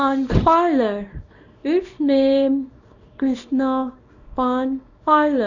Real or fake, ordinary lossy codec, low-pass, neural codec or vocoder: fake; none; 7.2 kHz; codec, 24 kHz, 0.9 kbps, WavTokenizer, small release